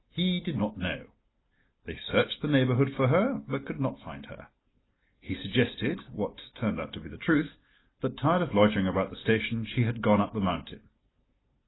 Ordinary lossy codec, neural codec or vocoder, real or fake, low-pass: AAC, 16 kbps; none; real; 7.2 kHz